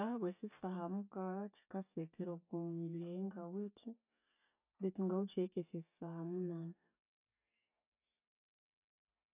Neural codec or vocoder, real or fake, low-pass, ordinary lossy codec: none; real; 3.6 kHz; none